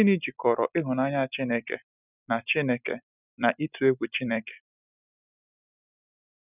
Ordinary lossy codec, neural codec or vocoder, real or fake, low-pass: none; none; real; 3.6 kHz